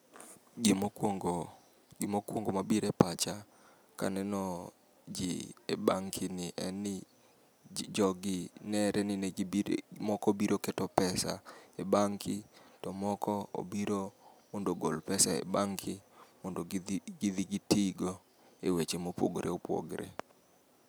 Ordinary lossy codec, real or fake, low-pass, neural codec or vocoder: none; real; none; none